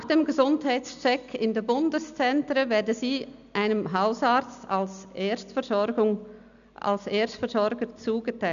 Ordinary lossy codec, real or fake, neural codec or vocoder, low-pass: none; real; none; 7.2 kHz